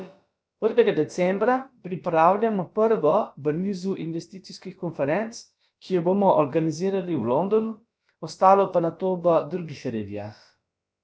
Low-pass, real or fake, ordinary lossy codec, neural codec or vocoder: none; fake; none; codec, 16 kHz, about 1 kbps, DyCAST, with the encoder's durations